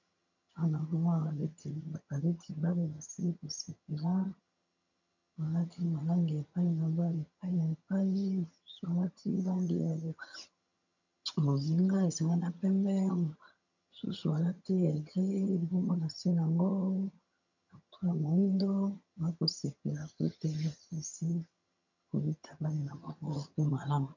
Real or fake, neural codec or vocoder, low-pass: fake; vocoder, 22.05 kHz, 80 mel bands, HiFi-GAN; 7.2 kHz